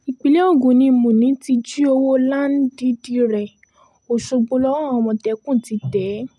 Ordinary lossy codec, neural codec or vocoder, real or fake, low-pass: none; none; real; none